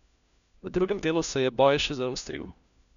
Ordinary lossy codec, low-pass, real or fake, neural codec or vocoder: none; 7.2 kHz; fake; codec, 16 kHz, 1 kbps, FunCodec, trained on LibriTTS, 50 frames a second